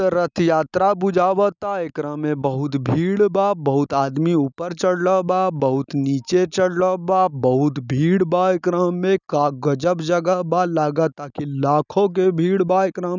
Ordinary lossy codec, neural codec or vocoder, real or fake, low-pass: none; none; real; 7.2 kHz